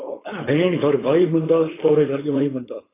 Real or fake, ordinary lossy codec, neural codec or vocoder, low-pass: fake; AAC, 16 kbps; codec, 16 kHz, 4.8 kbps, FACodec; 3.6 kHz